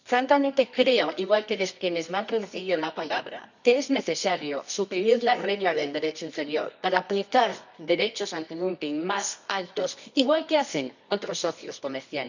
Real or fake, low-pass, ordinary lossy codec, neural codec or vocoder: fake; 7.2 kHz; none; codec, 24 kHz, 0.9 kbps, WavTokenizer, medium music audio release